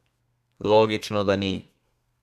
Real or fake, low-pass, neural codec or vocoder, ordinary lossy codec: fake; 14.4 kHz; codec, 32 kHz, 1.9 kbps, SNAC; none